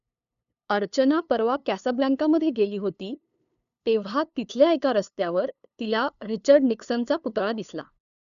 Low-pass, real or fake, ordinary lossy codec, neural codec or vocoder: 7.2 kHz; fake; none; codec, 16 kHz, 2 kbps, FunCodec, trained on LibriTTS, 25 frames a second